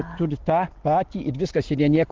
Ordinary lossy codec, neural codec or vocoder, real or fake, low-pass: Opus, 16 kbps; none; real; 7.2 kHz